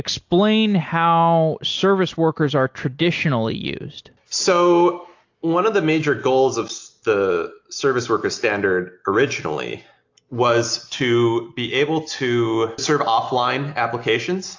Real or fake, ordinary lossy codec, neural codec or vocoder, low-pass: real; AAC, 48 kbps; none; 7.2 kHz